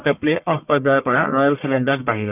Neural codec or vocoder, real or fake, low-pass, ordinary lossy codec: codec, 44.1 kHz, 1.7 kbps, Pupu-Codec; fake; 3.6 kHz; none